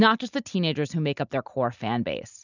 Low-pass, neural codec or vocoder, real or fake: 7.2 kHz; none; real